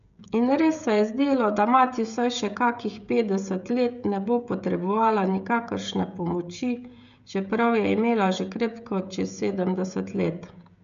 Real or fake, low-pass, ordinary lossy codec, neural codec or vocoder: fake; 7.2 kHz; none; codec, 16 kHz, 16 kbps, FreqCodec, smaller model